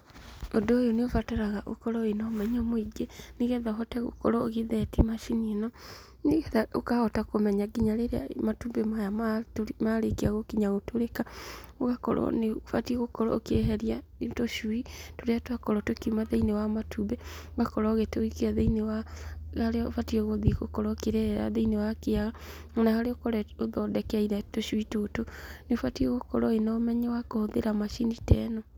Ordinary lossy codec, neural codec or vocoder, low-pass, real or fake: none; none; none; real